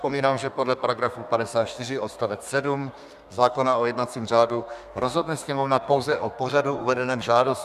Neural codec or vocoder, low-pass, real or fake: codec, 32 kHz, 1.9 kbps, SNAC; 14.4 kHz; fake